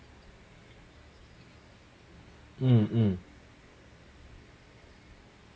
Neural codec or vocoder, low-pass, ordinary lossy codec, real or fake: none; none; none; real